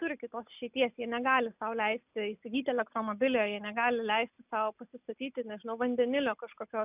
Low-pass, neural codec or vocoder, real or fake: 3.6 kHz; none; real